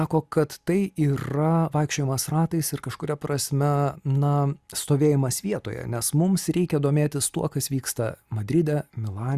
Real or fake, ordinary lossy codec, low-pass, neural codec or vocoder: real; Opus, 64 kbps; 14.4 kHz; none